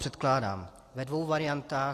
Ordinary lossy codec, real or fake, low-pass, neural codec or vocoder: AAC, 48 kbps; real; 14.4 kHz; none